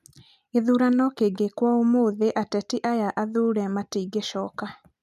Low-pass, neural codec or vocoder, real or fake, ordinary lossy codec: 14.4 kHz; none; real; none